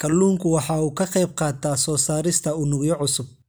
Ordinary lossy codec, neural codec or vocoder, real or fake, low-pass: none; none; real; none